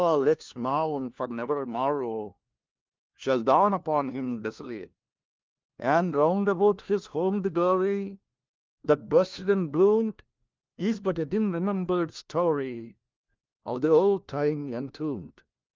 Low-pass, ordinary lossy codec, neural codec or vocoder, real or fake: 7.2 kHz; Opus, 32 kbps; codec, 16 kHz, 1 kbps, FunCodec, trained on LibriTTS, 50 frames a second; fake